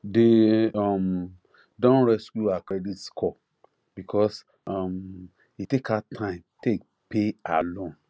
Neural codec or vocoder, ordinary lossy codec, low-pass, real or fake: none; none; none; real